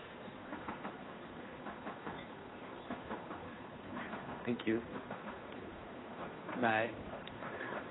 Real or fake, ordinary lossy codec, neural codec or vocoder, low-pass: fake; AAC, 16 kbps; codec, 16 kHz, 2 kbps, FunCodec, trained on Chinese and English, 25 frames a second; 7.2 kHz